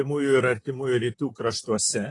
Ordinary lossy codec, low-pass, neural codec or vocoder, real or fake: AAC, 32 kbps; 10.8 kHz; vocoder, 44.1 kHz, 128 mel bands, Pupu-Vocoder; fake